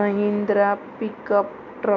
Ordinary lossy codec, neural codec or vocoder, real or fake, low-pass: none; none; real; 7.2 kHz